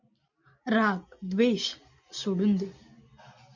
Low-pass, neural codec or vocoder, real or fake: 7.2 kHz; none; real